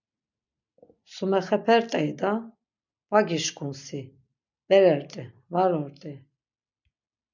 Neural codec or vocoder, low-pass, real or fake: none; 7.2 kHz; real